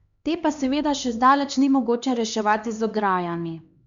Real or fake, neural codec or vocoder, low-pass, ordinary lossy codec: fake; codec, 16 kHz, 2 kbps, X-Codec, HuBERT features, trained on LibriSpeech; 7.2 kHz; Opus, 64 kbps